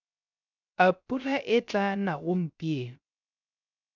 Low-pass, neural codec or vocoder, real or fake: 7.2 kHz; codec, 16 kHz, 0.3 kbps, FocalCodec; fake